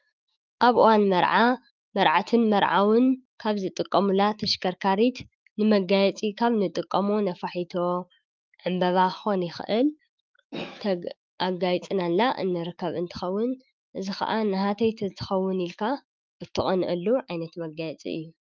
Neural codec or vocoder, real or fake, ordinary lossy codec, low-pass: autoencoder, 48 kHz, 128 numbers a frame, DAC-VAE, trained on Japanese speech; fake; Opus, 32 kbps; 7.2 kHz